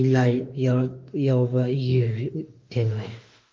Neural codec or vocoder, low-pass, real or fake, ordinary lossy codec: autoencoder, 48 kHz, 32 numbers a frame, DAC-VAE, trained on Japanese speech; 7.2 kHz; fake; Opus, 32 kbps